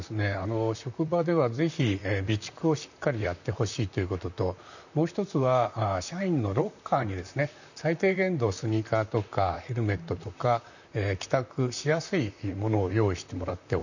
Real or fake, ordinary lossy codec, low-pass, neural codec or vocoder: fake; none; 7.2 kHz; vocoder, 44.1 kHz, 128 mel bands, Pupu-Vocoder